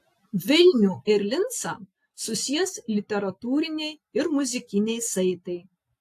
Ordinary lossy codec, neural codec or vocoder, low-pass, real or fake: AAC, 64 kbps; none; 14.4 kHz; real